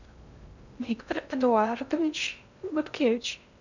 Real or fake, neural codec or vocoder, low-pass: fake; codec, 16 kHz in and 24 kHz out, 0.6 kbps, FocalCodec, streaming, 2048 codes; 7.2 kHz